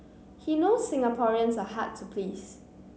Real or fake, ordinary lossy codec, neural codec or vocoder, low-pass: real; none; none; none